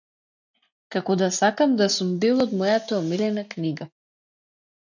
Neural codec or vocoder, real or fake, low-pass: none; real; 7.2 kHz